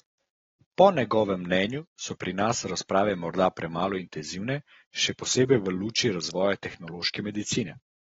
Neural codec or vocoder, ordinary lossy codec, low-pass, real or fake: none; AAC, 24 kbps; 7.2 kHz; real